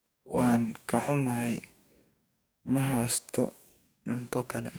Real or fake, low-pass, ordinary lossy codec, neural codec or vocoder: fake; none; none; codec, 44.1 kHz, 2.6 kbps, DAC